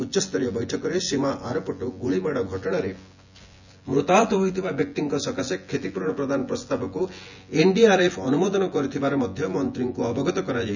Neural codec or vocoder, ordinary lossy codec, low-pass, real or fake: vocoder, 24 kHz, 100 mel bands, Vocos; none; 7.2 kHz; fake